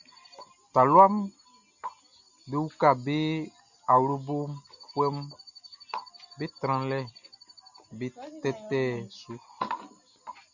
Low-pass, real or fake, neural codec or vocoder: 7.2 kHz; real; none